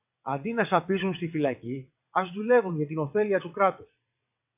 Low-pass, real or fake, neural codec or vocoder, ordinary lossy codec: 3.6 kHz; fake; vocoder, 22.05 kHz, 80 mel bands, WaveNeXt; AAC, 32 kbps